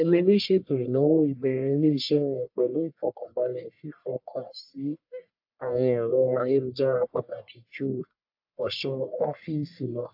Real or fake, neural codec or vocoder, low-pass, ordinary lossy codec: fake; codec, 44.1 kHz, 1.7 kbps, Pupu-Codec; 5.4 kHz; AAC, 48 kbps